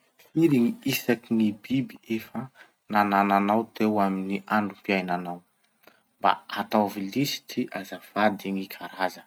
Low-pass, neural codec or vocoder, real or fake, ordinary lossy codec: 19.8 kHz; none; real; none